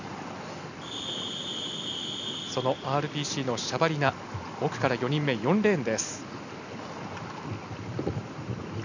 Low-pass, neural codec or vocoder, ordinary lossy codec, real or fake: 7.2 kHz; none; none; real